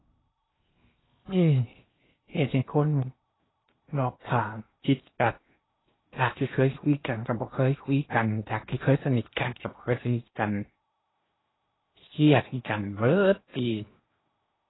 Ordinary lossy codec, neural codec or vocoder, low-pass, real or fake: AAC, 16 kbps; codec, 16 kHz in and 24 kHz out, 0.8 kbps, FocalCodec, streaming, 65536 codes; 7.2 kHz; fake